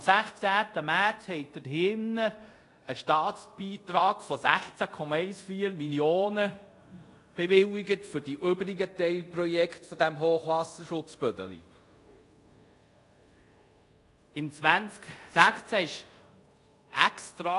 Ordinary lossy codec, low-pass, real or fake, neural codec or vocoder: AAC, 48 kbps; 10.8 kHz; fake; codec, 24 kHz, 0.5 kbps, DualCodec